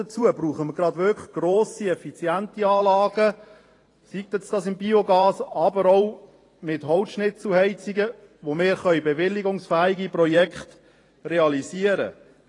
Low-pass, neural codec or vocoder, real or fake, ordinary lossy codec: 10.8 kHz; vocoder, 44.1 kHz, 128 mel bands every 512 samples, BigVGAN v2; fake; AAC, 32 kbps